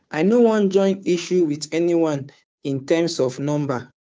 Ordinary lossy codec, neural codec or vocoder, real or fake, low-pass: none; codec, 16 kHz, 2 kbps, FunCodec, trained on Chinese and English, 25 frames a second; fake; none